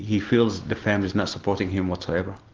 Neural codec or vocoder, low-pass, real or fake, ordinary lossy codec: none; 7.2 kHz; real; Opus, 16 kbps